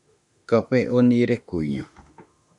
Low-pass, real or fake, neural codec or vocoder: 10.8 kHz; fake; autoencoder, 48 kHz, 32 numbers a frame, DAC-VAE, trained on Japanese speech